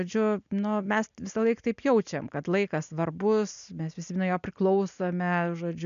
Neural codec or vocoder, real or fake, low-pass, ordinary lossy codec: none; real; 7.2 kHz; MP3, 96 kbps